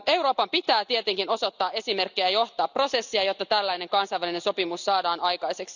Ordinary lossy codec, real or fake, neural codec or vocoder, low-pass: none; real; none; 7.2 kHz